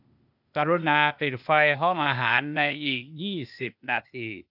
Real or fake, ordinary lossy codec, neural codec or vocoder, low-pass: fake; none; codec, 16 kHz, 0.8 kbps, ZipCodec; 5.4 kHz